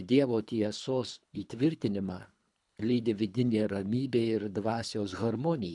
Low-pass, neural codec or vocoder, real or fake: 10.8 kHz; codec, 24 kHz, 3 kbps, HILCodec; fake